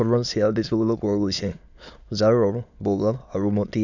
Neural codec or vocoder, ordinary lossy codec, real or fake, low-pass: autoencoder, 22.05 kHz, a latent of 192 numbers a frame, VITS, trained on many speakers; none; fake; 7.2 kHz